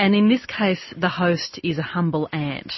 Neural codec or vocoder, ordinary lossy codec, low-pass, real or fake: none; MP3, 24 kbps; 7.2 kHz; real